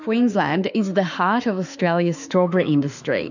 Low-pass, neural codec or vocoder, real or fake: 7.2 kHz; autoencoder, 48 kHz, 32 numbers a frame, DAC-VAE, trained on Japanese speech; fake